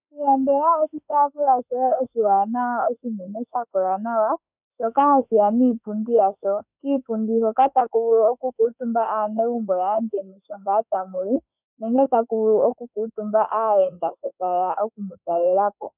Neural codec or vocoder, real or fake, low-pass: autoencoder, 48 kHz, 32 numbers a frame, DAC-VAE, trained on Japanese speech; fake; 3.6 kHz